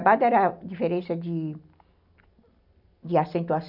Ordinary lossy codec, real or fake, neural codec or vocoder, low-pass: none; real; none; 5.4 kHz